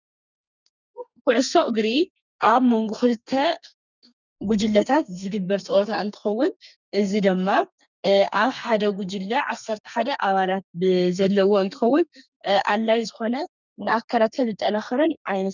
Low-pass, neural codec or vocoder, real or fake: 7.2 kHz; codec, 32 kHz, 1.9 kbps, SNAC; fake